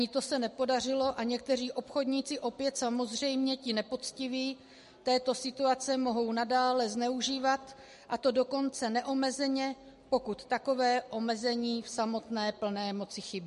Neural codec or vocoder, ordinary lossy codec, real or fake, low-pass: none; MP3, 48 kbps; real; 14.4 kHz